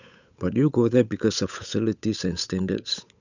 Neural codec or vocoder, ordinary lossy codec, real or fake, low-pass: codec, 16 kHz, 8 kbps, FunCodec, trained on Chinese and English, 25 frames a second; none; fake; 7.2 kHz